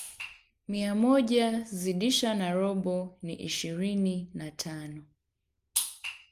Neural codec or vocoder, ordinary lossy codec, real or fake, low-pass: none; Opus, 32 kbps; real; 14.4 kHz